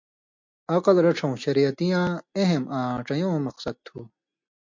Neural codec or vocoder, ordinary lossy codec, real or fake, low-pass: none; MP3, 48 kbps; real; 7.2 kHz